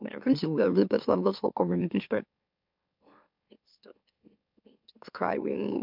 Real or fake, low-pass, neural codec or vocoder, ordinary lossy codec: fake; 5.4 kHz; autoencoder, 44.1 kHz, a latent of 192 numbers a frame, MeloTTS; MP3, 48 kbps